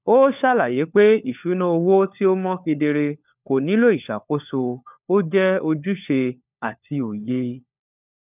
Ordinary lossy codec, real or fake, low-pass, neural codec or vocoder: none; fake; 3.6 kHz; codec, 16 kHz, 4 kbps, FunCodec, trained on LibriTTS, 50 frames a second